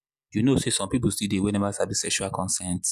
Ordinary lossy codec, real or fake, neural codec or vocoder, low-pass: none; real; none; 14.4 kHz